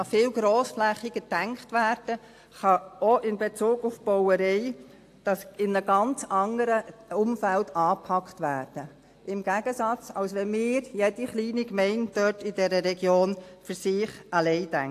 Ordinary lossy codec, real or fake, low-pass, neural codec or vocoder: AAC, 64 kbps; real; 14.4 kHz; none